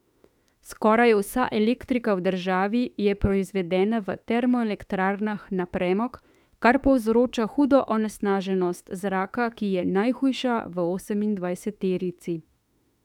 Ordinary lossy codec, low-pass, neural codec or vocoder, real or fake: none; 19.8 kHz; autoencoder, 48 kHz, 32 numbers a frame, DAC-VAE, trained on Japanese speech; fake